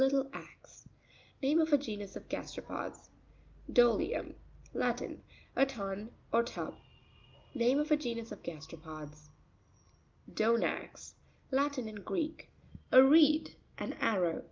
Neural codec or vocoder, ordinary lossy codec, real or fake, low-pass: none; Opus, 24 kbps; real; 7.2 kHz